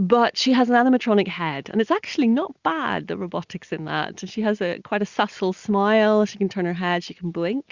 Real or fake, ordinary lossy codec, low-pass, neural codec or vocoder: real; Opus, 64 kbps; 7.2 kHz; none